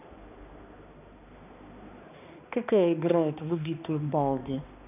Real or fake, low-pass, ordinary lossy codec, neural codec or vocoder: fake; 3.6 kHz; none; codec, 16 kHz, 2 kbps, X-Codec, HuBERT features, trained on balanced general audio